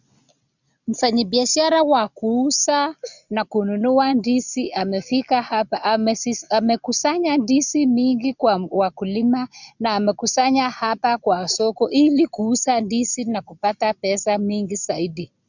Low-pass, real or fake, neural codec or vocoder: 7.2 kHz; real; none